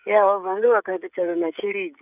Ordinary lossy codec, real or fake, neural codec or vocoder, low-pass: none; fake; codec, 16 kHz, 16 kbps, FreqCodec, smaller model; 3.6 kHz